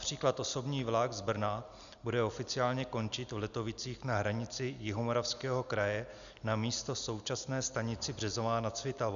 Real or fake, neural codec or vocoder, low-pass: real; none; 7.2 kHz